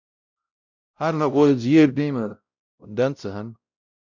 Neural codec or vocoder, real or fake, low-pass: codec, 16 kHz, 0.5 kbps, X-Codec, WavLM features, trained on Multilingual LibriSpeech; fake; 7.2 kHz